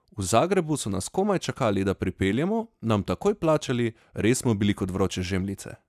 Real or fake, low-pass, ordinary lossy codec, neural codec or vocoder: real; 14.4 kHz; none; none